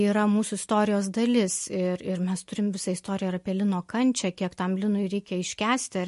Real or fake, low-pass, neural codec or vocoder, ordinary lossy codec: real; 14.4 kHz; none; MP3, 48 kbps